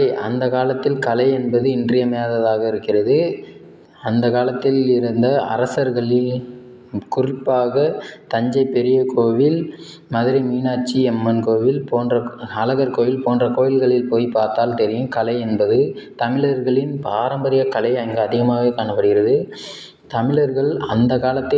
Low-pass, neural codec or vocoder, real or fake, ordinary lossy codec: none; none; real; none